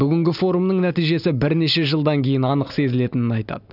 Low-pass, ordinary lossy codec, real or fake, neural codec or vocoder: 5.4 kHz; none; real; none